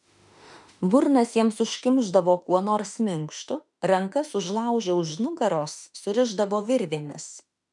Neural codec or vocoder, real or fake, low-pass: autoencoder, 48 kHz, 32 numbers a frame, DAC-VAE, trained on Japanese speech; fake; 10.8 kHz